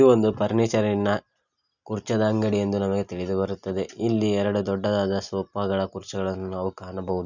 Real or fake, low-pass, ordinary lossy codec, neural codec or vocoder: real; 7.2 kHz; none; none